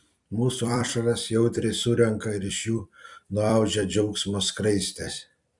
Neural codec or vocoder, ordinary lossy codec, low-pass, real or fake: vocoder, 44.1 kHz, 128 mel bands every 256 samples, BigVGAN v2; Opus, 64 kbps; 10.8 kHz; fake